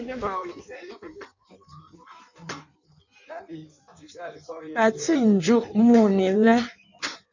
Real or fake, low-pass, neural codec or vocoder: fake; 7.2 kHz; codec, 16 kHz in and 24 kHz out, 1.1 kbps, FireRedTTS-2 codec